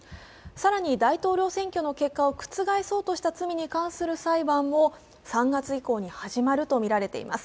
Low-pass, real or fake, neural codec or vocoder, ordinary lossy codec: none; real; none; none